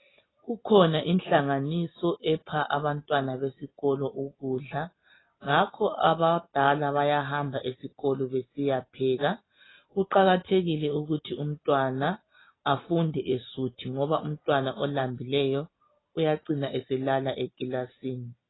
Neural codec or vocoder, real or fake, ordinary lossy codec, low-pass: none; real; AAC, 16 kbps; 7.2 kHz